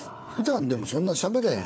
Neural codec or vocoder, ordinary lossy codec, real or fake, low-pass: codec, 16 kHz, 4 kbps, FunCodec, trained on Chinese and English, 50 frames a second; none; fake; none